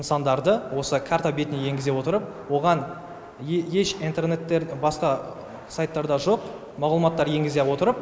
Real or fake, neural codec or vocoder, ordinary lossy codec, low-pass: real; none; none; none